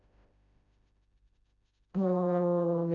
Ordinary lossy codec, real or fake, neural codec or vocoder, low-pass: none; fake; codec, 16 kHz, 0.5 kbps, FreqCodec, smaller model; 7.2 kHz